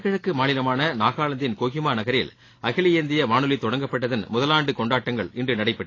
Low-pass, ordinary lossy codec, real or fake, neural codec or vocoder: 7.2 kHz; AAC, 32 kbps; real; none